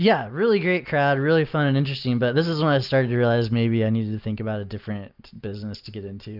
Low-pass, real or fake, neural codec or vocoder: 5.4 kHz; real; none